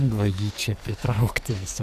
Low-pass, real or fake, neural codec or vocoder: 14.4 kHz; fake; codec, 44.1 kHz, 2.6 kbps, SNAC